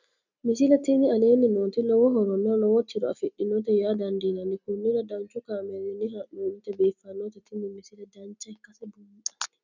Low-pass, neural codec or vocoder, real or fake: 7.2 kHz; none; real